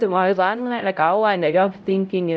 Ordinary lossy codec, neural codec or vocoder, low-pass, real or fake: none; codec, 16 kHz, 0.5 kbps, X-Codec, HuBERT features, trained on LibriSpeech; none; fake